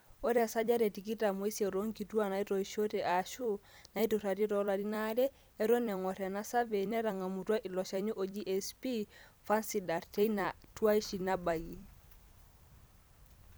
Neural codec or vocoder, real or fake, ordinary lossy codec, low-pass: vocoder, 44.1 kHz, 128 mel bands every 256 samples, BigVGAN v2; fake; none; none